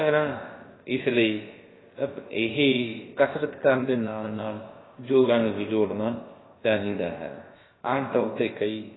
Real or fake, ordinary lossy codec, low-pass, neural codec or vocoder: fake; AAC, 16 kbps; 7.2 kHz; codec, 16 kHz, about 1 kbps, DyCAST, with the encoder's durations